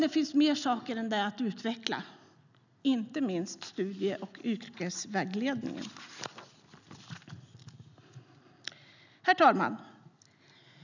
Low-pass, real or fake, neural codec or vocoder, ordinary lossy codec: 7.2 kHz; real; none; none